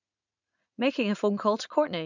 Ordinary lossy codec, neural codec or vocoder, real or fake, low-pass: none; vocoder, 44.1 kHz, 80 mel bands, Vocos; fake; 7.2 kHz